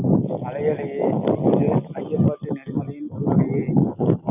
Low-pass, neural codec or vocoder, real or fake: 3.6 kHz; none; real